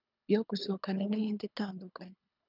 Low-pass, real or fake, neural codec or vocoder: 5.4 kHz; fake; codec, 24 kHz, 3 kbps, HILCodec